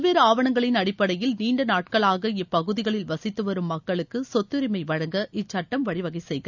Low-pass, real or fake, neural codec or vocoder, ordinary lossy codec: 7.2 kHz; real; none; none